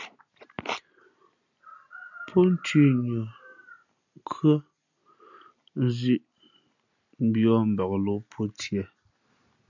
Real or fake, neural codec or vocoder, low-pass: real; none; 7.2 kHz